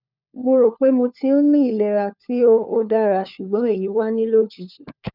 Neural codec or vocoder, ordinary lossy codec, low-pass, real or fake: codec, 16 kHz, 4 kbps, FunCodec, trained on LibriTTS, 50 frames a second; none; 5.4 kHz; fake